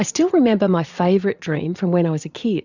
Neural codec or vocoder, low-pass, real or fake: vocoder, 44.1 kHz, 80 mel bands, Vocos; 7.2 kHz; fake